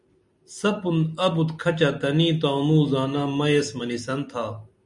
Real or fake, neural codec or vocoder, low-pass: real; none; 10.8 kHz